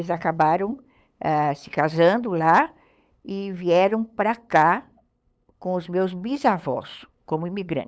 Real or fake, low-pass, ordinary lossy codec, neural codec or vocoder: fake; none; none; codec, 16 kHz, 8 kbps, FunCodec, trained on LibriTTS, 25 frames a second